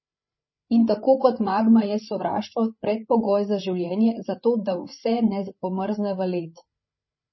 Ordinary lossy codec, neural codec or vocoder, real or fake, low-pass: MP3, 24 kbps; codec, 16 kHz, 8 kbps, FreqCodec, larger model; fake; 7.2 kHz